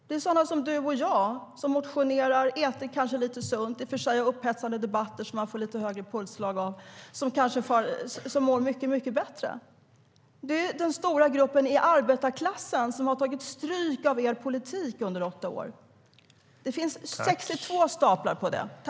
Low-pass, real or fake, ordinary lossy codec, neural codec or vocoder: none; real; none; none